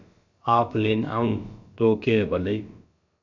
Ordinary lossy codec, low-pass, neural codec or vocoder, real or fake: MP3, 64 kbps; 7.2 kHz; codec, 16 kHz, about 1 kbps, DyCAST, with the encoder's durations; fake